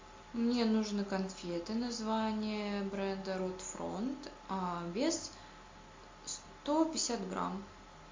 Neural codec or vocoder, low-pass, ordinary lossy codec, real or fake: none; 7.2 kHz; MP3, 48 kbps; real